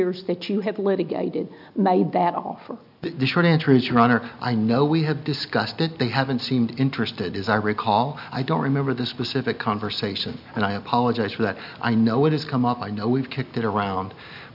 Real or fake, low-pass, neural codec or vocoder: real; 5.4 kHz; none